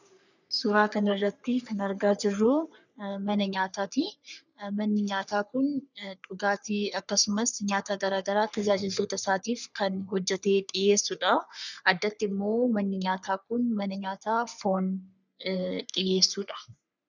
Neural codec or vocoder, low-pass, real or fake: codec, 44.1 kHz, 3.4 kbps, Pupu-Codec; 7.2 kHz; fake